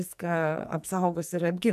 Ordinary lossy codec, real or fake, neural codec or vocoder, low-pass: MP3, 96 kbps; fake; codec, 44.1 kHz, 2.6 kbps, SNAC; 14.4 kHz